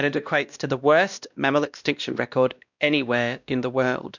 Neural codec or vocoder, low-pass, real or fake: codec, 16 kHz, 1 kbps, X-Codec, WavLM features, trained on Multilingual LibriSpeech; 7.2 kHz; fake